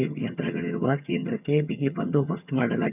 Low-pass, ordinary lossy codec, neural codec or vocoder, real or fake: 3.6 kHz; none; vocoder, 22.05 kHz, 80 mel bands, HiFi-GAN; fake